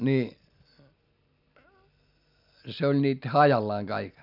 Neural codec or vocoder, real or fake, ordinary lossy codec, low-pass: none; real; none; 5.4 kHz